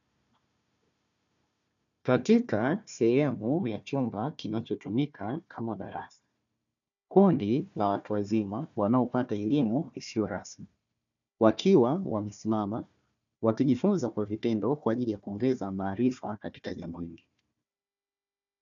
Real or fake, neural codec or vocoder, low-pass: fake; codec, 16 kHz, 1 kbps, FunCodec, trained on Chinese and English, 50 frames a second; 7.2 kHz